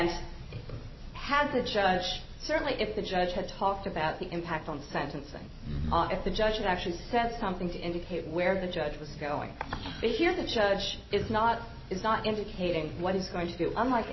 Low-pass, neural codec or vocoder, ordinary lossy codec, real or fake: 7.2 kHz; none; MP3, 24 kbps; real